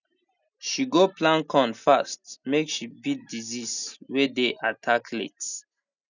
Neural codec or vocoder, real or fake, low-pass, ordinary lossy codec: none; real; 7.2 kHz; none